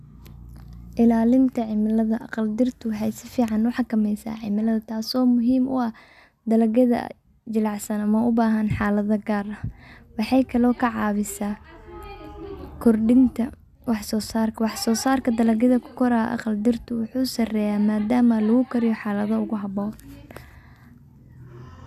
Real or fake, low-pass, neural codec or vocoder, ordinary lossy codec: real; 14.4 kHz; none; none